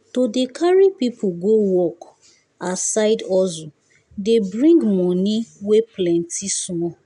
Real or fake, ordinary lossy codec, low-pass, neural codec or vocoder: real; none; 10.8 kHz; none